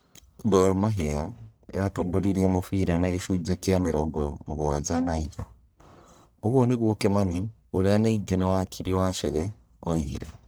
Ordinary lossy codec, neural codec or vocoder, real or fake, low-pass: none; codec, 44.1 kHz, 1.7 kbps, Pupu-Codec; fake; none